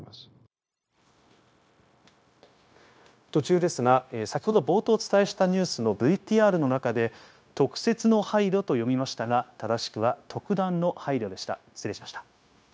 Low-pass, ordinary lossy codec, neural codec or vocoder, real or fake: none; none; codec, 16 kHz, 0.9 kbps, LongCat-Audio-Codec; fake